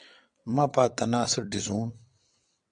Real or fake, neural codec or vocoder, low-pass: fake; vocoder, 22.05 kHz, 80 mel bands, WaveNeXt; 9.9 kHz